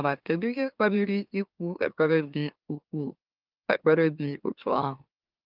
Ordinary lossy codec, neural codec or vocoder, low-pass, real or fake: Opus, 24 kbps; autoencoder, 44.1 kHz, a latent of 192 numbers a frame, MeloTTS; 5.4 kHz; fake